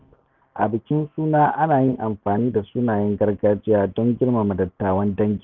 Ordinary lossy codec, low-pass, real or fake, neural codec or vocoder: none; 7.2 kHz; real; none